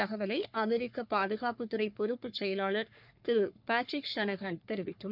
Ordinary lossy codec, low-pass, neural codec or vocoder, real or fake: none; 5.4 kHz; codec, 44.1 kHz, 3.4 kbps, Pupu-Codec; fake